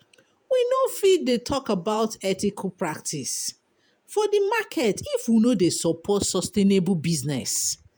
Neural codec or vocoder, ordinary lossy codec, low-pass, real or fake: vocoder, 48 kHz, 128 mel bands, Vocos; none; none; fake